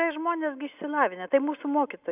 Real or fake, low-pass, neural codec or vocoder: real; 3.6 kHz; none